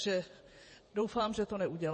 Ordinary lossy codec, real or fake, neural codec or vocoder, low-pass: MP3, 32 kbps; fake; vocoder, 48 kHz, 128 mel bands, Vocos; 10.8 kHz